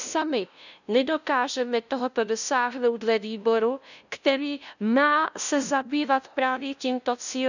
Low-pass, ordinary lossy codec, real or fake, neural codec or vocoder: 7.2 kHz; none; fake; codec, 16 kHz, 0.5 kbps, FunCodec, trained on LibriTTS, 25 frames a second